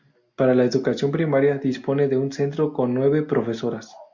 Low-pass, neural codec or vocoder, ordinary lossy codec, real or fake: 7.2 kHz; none; MP3, 64 kbps; real